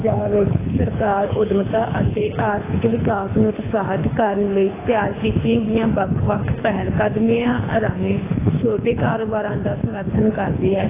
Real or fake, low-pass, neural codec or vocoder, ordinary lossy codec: fake; 3.6 kHz; codec, 24 kHz, 3 kbps, HILCodec; AAC, 16 kbps